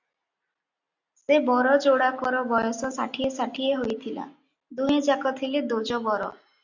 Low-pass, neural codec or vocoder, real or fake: 7.2 kHz; none; real